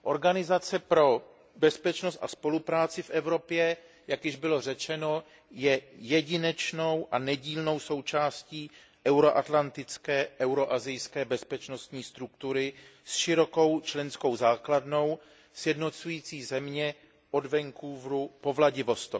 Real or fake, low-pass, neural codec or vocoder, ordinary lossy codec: real; none; none; none